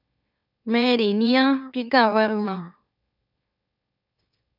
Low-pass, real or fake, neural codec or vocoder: 5.4 kHz; fake; autoencoder, 44.1 kHz, a latent of 192 numbers a frame, MeloTTS